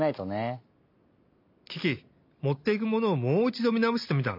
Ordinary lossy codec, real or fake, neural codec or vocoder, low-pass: MP3, 48 kbps; real; none; 5.4 kHz